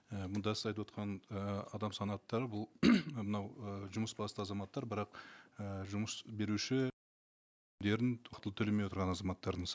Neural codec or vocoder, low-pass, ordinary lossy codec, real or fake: none; none; none; real